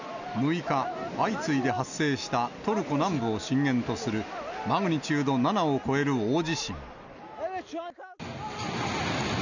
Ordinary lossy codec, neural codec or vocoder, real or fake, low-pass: none; none; real; 7.2 kHz